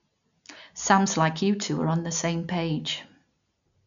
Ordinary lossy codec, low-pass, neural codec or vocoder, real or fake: none; 7.2 kHz; none; real